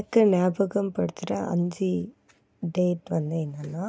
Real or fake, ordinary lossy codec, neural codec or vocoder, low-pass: real; none; none; none